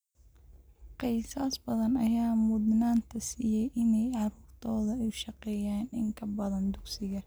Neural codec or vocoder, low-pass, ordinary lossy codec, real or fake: none; none; none; real